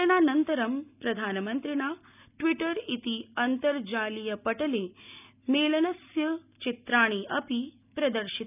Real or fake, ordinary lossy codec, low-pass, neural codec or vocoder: real; none; 3.6 kHz; none